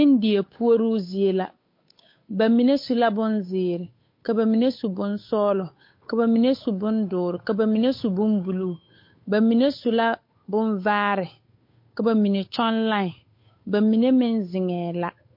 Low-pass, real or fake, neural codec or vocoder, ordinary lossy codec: 5.4 kHz; fake; codec, 16 kHz, 8 kbps, FunCodec, trained on Chinese and English, 25 frames a second; MP3, 32 kbps